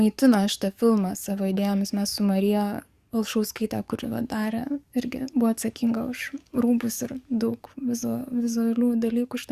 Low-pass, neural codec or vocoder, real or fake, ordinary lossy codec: 14.4 kHz; codec, 44.1 kHz, 7.8 kbps, DAC; fake; Opus, 64 kbps